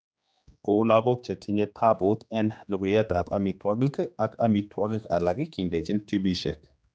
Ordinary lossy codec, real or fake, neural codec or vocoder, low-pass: none; fake; codec, 16 kHz, 2 kbps, X-Codec, HuBERT features, trained on general audio; none